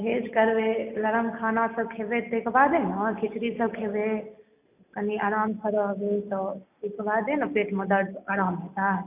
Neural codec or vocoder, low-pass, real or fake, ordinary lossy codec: none; 3.6 kHz; real; none